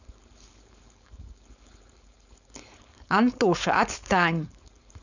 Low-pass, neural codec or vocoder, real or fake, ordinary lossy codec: 7.2 kHz; codec, 16 kHz, 4.8 kbps, FACodec; fake; none